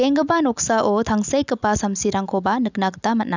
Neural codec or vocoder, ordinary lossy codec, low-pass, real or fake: none; none; 7.2 kHz; real